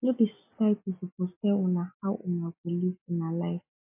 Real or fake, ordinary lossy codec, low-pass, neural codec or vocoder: real; MP3, 32 kbps; 3.6 kHz; none